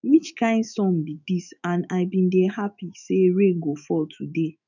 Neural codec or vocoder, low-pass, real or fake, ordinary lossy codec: autoencoder, 48 kHz, 128 numbers a frame, DAC-VAE, trained on Japanese speech; 7.2 kHz; fake; none